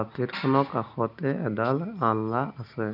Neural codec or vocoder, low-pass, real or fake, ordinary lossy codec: none; 5.4 kHz; real; none